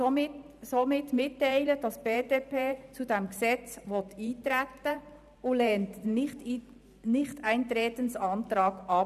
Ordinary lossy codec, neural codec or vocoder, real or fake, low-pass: none; vocoder, 44.1 kHz, 128 mel bands every 512 samples, BigVGAN v2; fake; 14.4 kHz